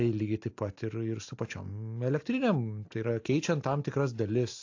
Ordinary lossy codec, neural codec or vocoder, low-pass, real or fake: AAC, 48 kbps; none; 7.2 kHz; real